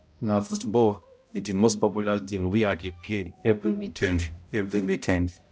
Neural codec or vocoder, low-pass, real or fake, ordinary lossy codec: codec, 16 kHz, 0.5 kbps, X-Codec, HuBERT features, trained on balanced general audio; none; fake; none